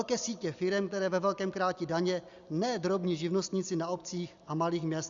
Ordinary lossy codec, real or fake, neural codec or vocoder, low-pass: Opus, 64 kbps; real; none; 7.2 kHz